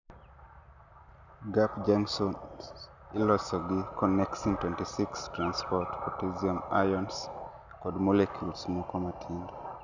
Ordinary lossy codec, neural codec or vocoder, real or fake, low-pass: none; none; real; 7.2 kHz